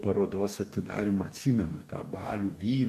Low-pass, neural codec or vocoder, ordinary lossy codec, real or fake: 14.4 kHz; codec, 44.1 kHz, 2.6 kbps, DAC; MP3, 64 kbps; fake